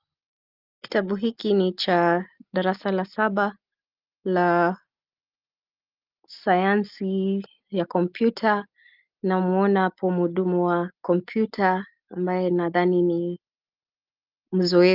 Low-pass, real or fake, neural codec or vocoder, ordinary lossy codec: 5.4 kHz; real; none; Opus, 32 kbps